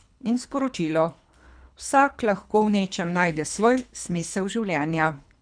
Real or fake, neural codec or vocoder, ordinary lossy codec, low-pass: fake; codec, 24 kHz, 3 kbps, HILCodec; none; 9.9 kHz